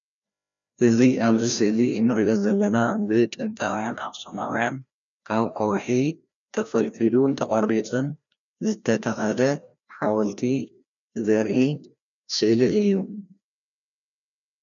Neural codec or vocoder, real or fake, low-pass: codec, 16 kHz, 1 kbps, FreqCodec, larger model; fake; 7.2 kHz